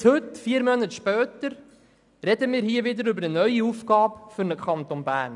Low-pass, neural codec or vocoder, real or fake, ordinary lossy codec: 10.8 kHz; none; real; none